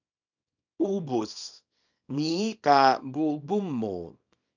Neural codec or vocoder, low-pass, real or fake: codec, 24 kHz, 0.9 kbps, WavTokenizer, small release; 7.2 kHz; fake